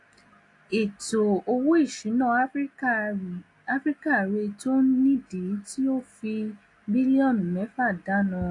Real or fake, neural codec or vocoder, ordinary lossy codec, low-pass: real; none; AAC, 48 kbps; 10.8 kHz